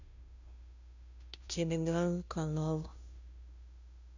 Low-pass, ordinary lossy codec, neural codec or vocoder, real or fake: 7.2 kHz; none; codec, 16 kHz, 0.5 kbps, FunCodec, trained on Chinese and English, 25 frames a second; fake